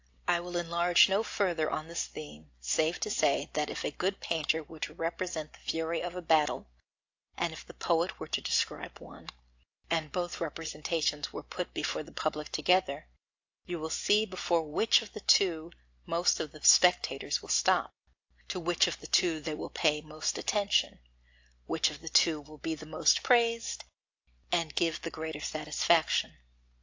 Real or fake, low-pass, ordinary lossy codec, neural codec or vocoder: real; 7.2 kHz; AAC, 48 kbps; none